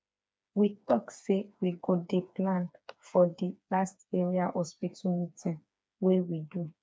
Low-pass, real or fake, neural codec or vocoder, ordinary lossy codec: none; fake; codec, 16 kHz, 4 kbps, FreqCodec, smaller model; none